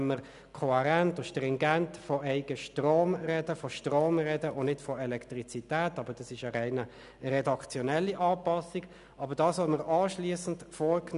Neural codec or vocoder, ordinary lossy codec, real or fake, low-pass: none; none; real; 10.8 kHz